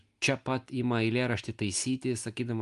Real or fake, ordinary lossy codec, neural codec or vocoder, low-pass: real; Opus, 32 kbps; none; 10.8 kHz